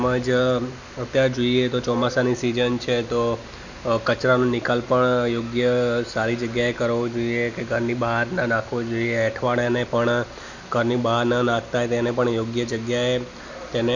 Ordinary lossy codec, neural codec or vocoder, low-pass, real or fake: none; none; 7.2 kHz; real